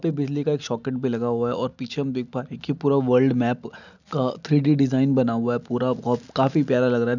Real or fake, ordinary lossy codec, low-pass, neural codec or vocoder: real; none; 7.2 kHz; none